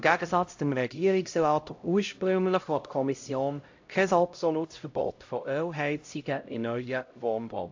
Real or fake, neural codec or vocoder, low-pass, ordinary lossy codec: fake; codec, 16 kHz, 0.5 kbps, X-Codec, HuBERT features, trained on LibriSpeech; 7.2 kHz; AAC, 48 kbps